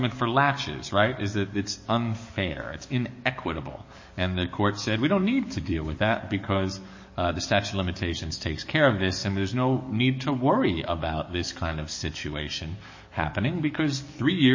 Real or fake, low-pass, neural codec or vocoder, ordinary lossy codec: fake; 7.2 kHz; codec, 44.1 kHz, 7.8 kbps, DAC; MP3, 32 kbps